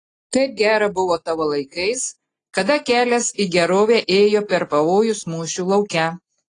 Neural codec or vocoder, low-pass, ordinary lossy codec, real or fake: none; 10.8 kHz; AAC, 32 kbps; real